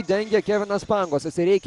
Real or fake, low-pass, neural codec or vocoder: real; 10.8 kHz; none